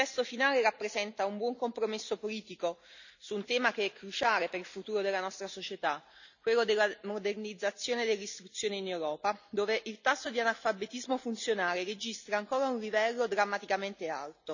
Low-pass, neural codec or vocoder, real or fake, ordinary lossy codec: 7.2 kHz; none; real; none